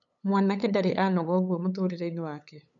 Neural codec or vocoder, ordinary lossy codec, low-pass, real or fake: codec, 16 kHz, 8 kbps, FunCodec, trained on LibriTTS, 25 frames a second; none; 7.2 kHz; fake